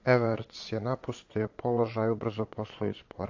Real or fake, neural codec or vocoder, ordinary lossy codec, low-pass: fake; vocoder, 22.05 kHz, 80 mel bands, Vocos; AAC, 48 kbps; 7.2 kHz